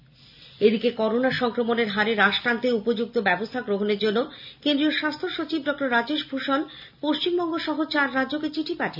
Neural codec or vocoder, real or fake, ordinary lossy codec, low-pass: none; real; none; 5.4 kHz